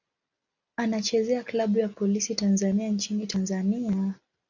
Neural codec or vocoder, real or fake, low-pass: none; real; 7.2 kHz